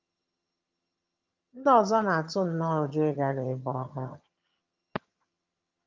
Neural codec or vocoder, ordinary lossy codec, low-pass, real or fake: vocoder, 22.05 kHz, 80 mel bands, HiFi-GAN; Opus, 24 kbps; 7.2 kHz; fake